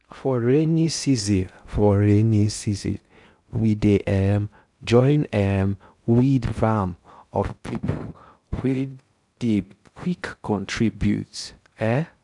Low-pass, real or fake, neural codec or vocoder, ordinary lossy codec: 10.8 kHz; fake; codec, 16 kHz in and 24 kHz out, 0.6 kbps, FocalCodec, streaming, 2048 codes; none